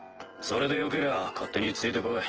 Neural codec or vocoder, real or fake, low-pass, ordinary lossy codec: vocoder, 24 kHz, 100 mel bands, Vocos; fake; 7.2 kHz; Opus, 16 kbps